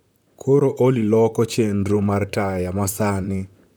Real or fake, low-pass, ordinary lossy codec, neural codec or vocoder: fake; none; none; vocoder, 44.1 kHz, 128 mel bands, Pupu-Vocoder